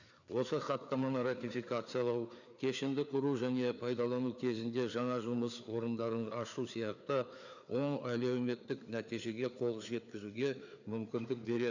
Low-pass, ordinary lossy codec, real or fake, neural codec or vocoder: 7.2 kHz; none; fake; codec, 16 kHz, 4 kbps, FreqCodec, larger model